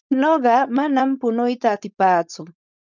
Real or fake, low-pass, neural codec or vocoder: fake; 7.2 kHz; codec, 16 kHz, 4.8 kbps, FACodec